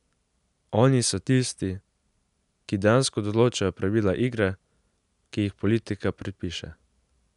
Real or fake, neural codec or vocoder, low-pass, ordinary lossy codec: real; none; 10.8 kHz; none